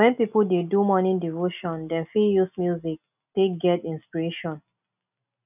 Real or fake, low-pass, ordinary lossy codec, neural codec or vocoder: real; 3.6 kHz; none; none